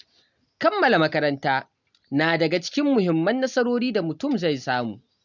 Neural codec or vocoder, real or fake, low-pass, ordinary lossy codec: none; real; 7.2 kHz; none